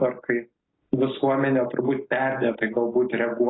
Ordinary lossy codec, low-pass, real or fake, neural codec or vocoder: AAC, 16 kbps; 7.2 kHz; real; none